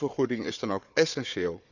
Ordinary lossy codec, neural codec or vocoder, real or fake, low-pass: none; codec, 16 kHz, 4 kbps, FunCodec, trained on Chinese and English, 50 frames a second; fake; 7.2 kHz